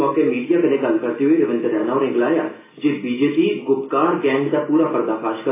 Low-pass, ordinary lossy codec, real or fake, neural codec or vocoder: 3.6 kHz; AAC, 16 kbps; real; none